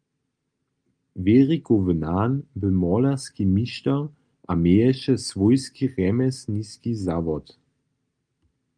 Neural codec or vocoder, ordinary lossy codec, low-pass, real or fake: none; Opus, 32 kbps; 9.9 kHz; real